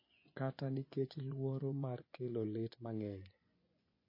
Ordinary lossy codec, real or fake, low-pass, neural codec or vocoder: MP3, 24 kbps; fake; 5.4 kHz; vocoder, 24 kHz, 100 mel bands, Vocos